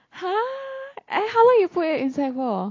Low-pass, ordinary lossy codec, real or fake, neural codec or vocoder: 7.2 kHz; AAC, 32 kbps; real; none